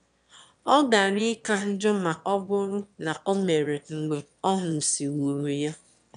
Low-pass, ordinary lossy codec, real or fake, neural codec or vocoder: 9.9 kHz; none; fake; autoencoder, 22.05 kHz, a latent of 192 numbers a frame, VITS, trained on one speaker